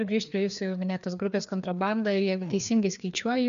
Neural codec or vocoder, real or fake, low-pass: codec, 16 kHz, 2 kbps, FreqCodec, larger model; fake; 7.2 kHz